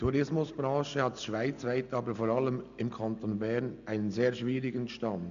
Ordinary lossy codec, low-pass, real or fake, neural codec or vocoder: none; 7.2 kHz; real; none